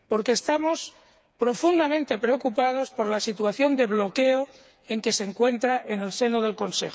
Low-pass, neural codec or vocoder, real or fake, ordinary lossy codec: none; codec, 16 kHz, 4 kbps, FreqCodec, smaller model; fake; none